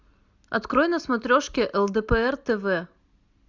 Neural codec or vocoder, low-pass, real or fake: none; 7.2 kHz; real